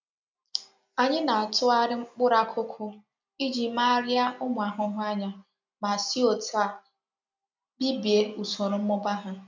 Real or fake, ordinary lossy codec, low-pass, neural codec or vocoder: real; MP3, 64 kbps; 7.2 kHz; none